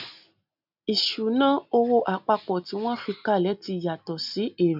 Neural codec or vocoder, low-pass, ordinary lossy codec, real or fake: none; 5.4 kHz; none; real